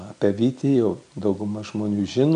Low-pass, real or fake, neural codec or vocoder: 9.9 kHz; real; none